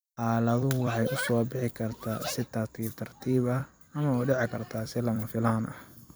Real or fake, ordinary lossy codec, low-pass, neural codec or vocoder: fake; none; none; vocoder, 44.1 kHz, 128 mel bands every 256 samples, BigVGAN v2